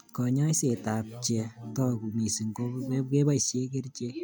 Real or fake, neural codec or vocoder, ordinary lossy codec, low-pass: real; none; none; none